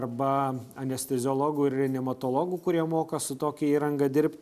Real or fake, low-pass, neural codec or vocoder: real; 14.4 kHz; none